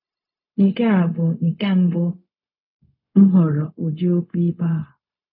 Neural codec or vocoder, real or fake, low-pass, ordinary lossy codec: codec, 16 kHz, 0.4 kbps, LongCat-Audio-Codec; fake; 5.4 kHz; none